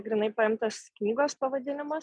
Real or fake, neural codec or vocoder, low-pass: real; none; 9.9 kHz